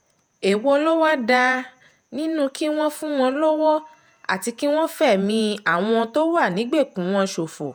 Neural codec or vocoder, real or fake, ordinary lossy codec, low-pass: vocoder, 48 kHz, 128 mel bands, Vocos; fake; none; none